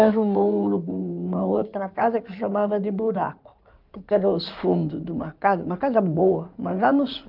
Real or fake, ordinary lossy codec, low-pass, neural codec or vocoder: fake; Opus, 24 kbps; 5.4 kHz; codec, 16 kHz in and 24 kHz out, 2.2 kbps, FireRedTTS-2 codec